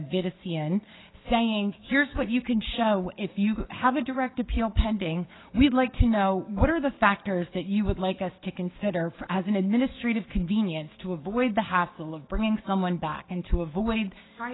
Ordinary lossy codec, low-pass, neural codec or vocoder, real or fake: AAC, 16 kbps; 7.2 kHz; codec, 16 kHz, 6 kbps, DAC; fake